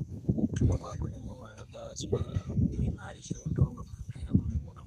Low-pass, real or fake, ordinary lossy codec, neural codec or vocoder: 14.4 kHz; fake; none; codec, 32 kHz, 1.9 kbps, SNAC